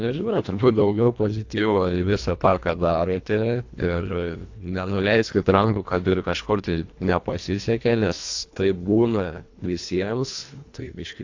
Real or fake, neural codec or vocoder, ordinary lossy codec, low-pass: fake; codec, 24 kHz, 1.5 kbps, HILCodec; AAC, 48 kbps; 7.2 kHz